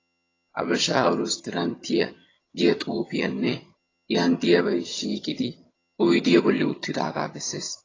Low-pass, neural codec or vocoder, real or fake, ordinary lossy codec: 7.2 kHz; vocoder, 22.05 kHz, 80 mel bands, HiFi-GAN; fake; AAC, 32 kbps